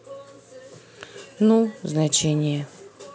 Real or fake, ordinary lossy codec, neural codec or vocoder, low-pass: real; none; none; none